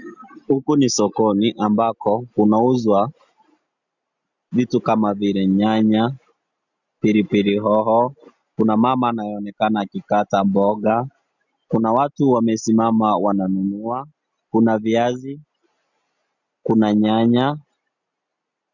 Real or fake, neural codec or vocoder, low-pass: real; none; 7.2 kHz